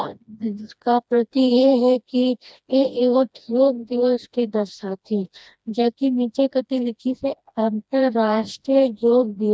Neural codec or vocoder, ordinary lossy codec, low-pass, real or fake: codec, 16 kHz, 1 kbps, FreqCodec, smaller model; none; none; fake